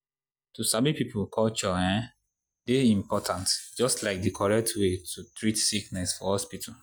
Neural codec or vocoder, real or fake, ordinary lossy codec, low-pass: none; real; none; none